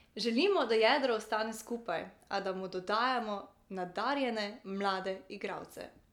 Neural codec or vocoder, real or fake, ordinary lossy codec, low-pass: none; real; none; 19.8 kHz